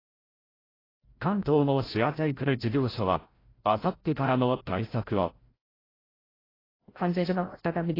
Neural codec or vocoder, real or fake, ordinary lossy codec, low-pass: codec, 16 kHz, 0.5 kbps, FreqCodec, larger model; fake; AAC, 24 kbps; 5.4 kHz